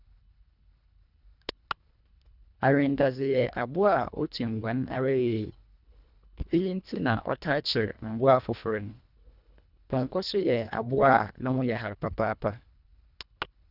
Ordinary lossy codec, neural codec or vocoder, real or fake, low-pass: none; codec, 24 kHz, 1.5 kbps, HILCodec; fake; 5.4 kHz